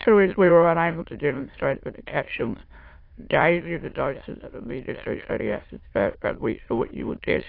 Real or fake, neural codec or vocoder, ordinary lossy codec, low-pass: fake; autoencoder, 22.05 kHz, a latent of 192 numbers a frame, VITS, trained on many speakers; AAC, 32 kbps; 5.4 kHz